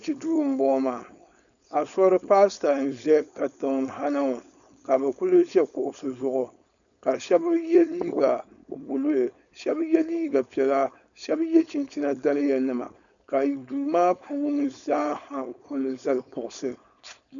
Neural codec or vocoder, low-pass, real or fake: codec, 16 kHz, 4.8 kbps, FACodec; 7.2 kHz; fake